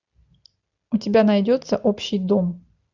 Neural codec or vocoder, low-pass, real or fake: none; 7.2 kHz; real